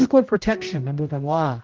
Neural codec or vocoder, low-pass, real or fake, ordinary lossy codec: codec, 16 kHz, 0.5 kbps, X-Codec, HuBERT features, trained on general audio; 7.2 kHz; fake; Opus, 16 kbps